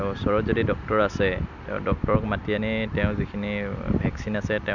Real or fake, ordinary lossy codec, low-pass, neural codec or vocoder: real; none; 7.2 kHz; none